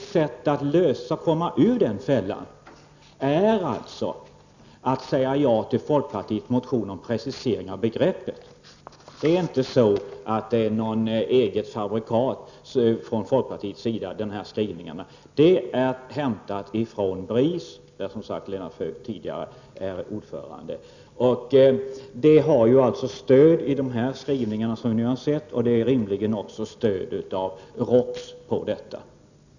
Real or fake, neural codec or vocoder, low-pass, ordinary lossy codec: real; none; 7.2 kHz; none